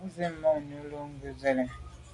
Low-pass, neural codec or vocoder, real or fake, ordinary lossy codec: 10.8 kHz; none; real; MP3, 96 kbps